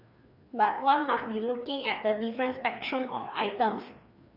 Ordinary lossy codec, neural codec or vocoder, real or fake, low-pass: AAC, 48 kbps; codec, 16 kHz, 2 kbps, FreqCodec, larger model; fake; 5.4 kHz